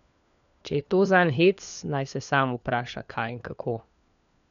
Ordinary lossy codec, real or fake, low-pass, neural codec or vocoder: none; fake; 7.2 kHz; codec, 16 kHz, 4 kbps, FunCodec, trained on LibriTTS, 50 frames a second